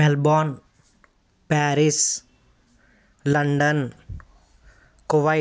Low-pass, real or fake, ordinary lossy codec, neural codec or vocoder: none; real; none; none